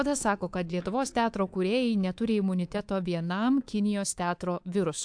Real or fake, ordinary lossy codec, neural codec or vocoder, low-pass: fake; AAC, 64 kbps; codec, 24 kHz, 3.1 kbps, DualCodec; 9.9 kHz